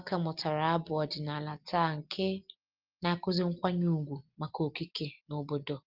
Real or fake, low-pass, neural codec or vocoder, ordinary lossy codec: real; 5.4 kHz; none; Opus, 32 kbps